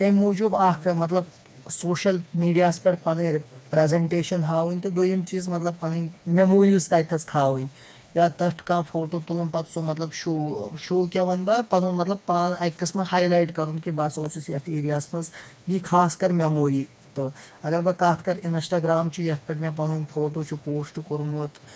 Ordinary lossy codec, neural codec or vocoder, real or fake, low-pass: none; codec, 16 kHz, 2 kbps, FreqCodec, smaller model; fake; none